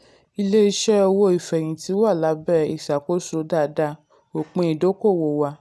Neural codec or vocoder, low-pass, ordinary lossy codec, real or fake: none; none; none; real